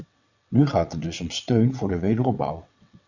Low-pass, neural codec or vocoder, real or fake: 7.2 kHz; vocoder, 22.05 kHz, 80 mel bands, WaveNeXt; fake